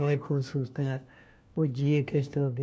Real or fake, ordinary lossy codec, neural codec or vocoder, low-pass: fake; none; codec, 16 kHz, 1 kbps, FunCodec, trained on LibriTTS, 50 frames a second; none